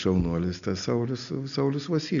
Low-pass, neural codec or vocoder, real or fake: 7.2 kHz; none; real